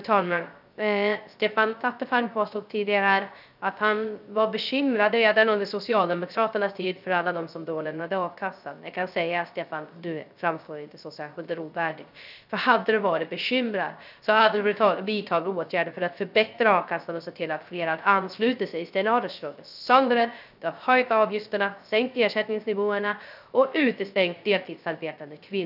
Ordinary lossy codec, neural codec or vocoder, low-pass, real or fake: none; codec, 16 kHz, 0.3 kbps, FocalCodec; 5.4 kHz; fake